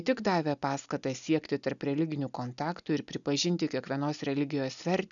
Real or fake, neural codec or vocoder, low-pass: real; none; 7.2 kHz